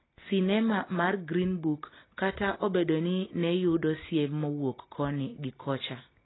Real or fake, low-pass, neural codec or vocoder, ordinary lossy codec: real; 7.2 kHz; none; AAC, 16 kbps